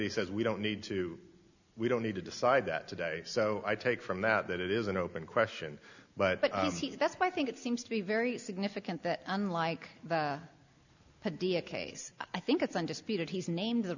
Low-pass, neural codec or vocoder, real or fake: 7.2 kHz; none; real